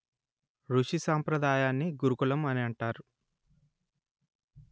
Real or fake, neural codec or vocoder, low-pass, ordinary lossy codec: real; none; none; none